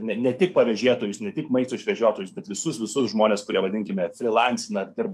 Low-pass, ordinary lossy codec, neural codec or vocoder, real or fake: 14.4 kHz; AAC, 96 kbps; codec, 44.1 kHz, 7.8 kbps, DAC; fake